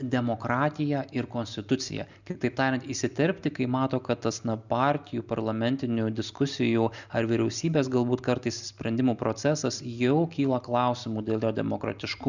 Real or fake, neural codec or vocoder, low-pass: real; none; 7.2 kHz